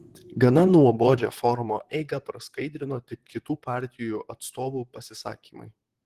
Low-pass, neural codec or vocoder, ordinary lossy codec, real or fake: 14.4 kHz; vocoder, 44.1 kHz, 128 mel bands, Pupu-Vocoder; Opus, 16 kbps; fake